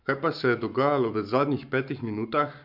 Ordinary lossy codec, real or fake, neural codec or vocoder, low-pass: none; fake; codec, 44.1 kHz, 7.8 kbps, Pupu-Codec; 5.4 kHz